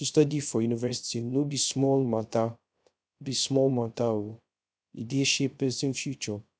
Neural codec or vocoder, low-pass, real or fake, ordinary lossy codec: codec, 16 kHz, 0.3 kbps, FocalCodec; none; fake; none